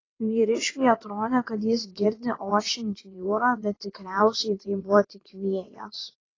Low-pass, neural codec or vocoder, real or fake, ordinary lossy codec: 7.2 kHz; vocoder, 22.05 kHz, 80 mel bands, WaveNeXt; fake; AAC, 32 kbps